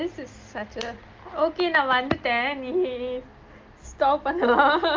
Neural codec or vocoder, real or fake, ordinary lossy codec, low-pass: none; real; Opus, 32 kbps; 7.2 kHz